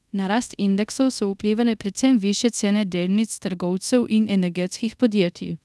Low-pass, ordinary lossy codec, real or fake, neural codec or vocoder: none; none; fake; codec, 24 kHz, 0.5 kbps, DualCodec